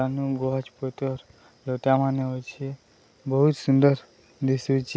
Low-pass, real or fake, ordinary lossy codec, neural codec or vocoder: none; real; none; none